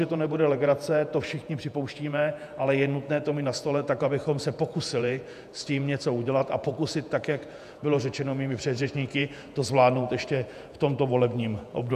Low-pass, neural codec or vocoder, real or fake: 14.4 kHz; vocoder, 48 kHz, 128 mel bands, Vocos; fake